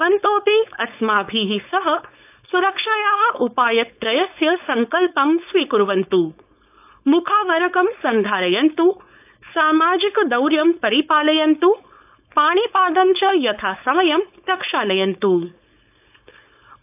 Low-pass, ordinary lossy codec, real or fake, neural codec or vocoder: 3.6 kHz; AAC, 32 kbps; fake; codec, 16 kHz, 4.8 kbps, FACodec